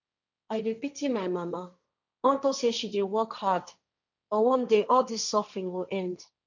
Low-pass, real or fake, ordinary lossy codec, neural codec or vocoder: 7.2 kHz; fake; none; codec, 16 kHz, 1.1 kbps, Voila-Tokenizer